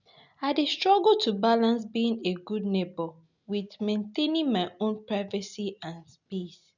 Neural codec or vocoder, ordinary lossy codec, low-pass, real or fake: none; none; 7.2 kHz; real